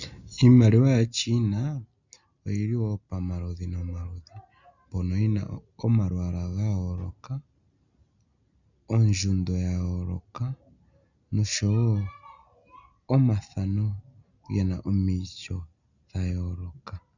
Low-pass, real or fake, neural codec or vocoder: 7.2 kHz; real; none